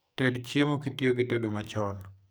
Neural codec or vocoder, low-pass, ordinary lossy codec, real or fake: codec, 44.1 kHz, 2.6 kbps, SNAC; none; none; fake